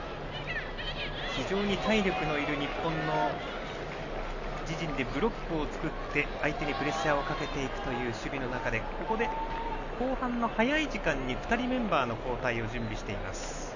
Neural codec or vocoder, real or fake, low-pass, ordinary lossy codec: none; real; 7.2 kHz; none